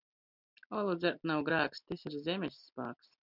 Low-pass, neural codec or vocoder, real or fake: 5.4 kHz; none; real